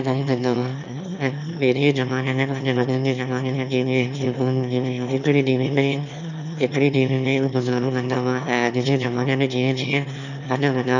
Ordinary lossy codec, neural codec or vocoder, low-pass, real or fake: none; autoencoder, 22.05 kHz, a latent of 192 numbers a frame, VITS, trained on one speaker; 7.2 kHz; fake